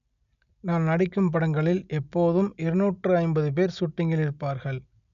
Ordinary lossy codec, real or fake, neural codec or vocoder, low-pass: none; real; none; 7.2 kHz